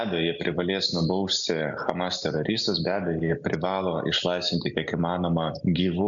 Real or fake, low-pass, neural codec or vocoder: real; 7.2 kHz; none